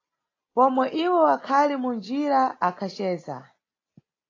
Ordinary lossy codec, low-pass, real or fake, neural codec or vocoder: AAC, 32 kbps; 7.2 kHz; real; none